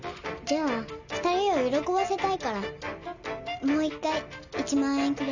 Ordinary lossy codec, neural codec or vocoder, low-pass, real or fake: none; none; 7.2 kHz; real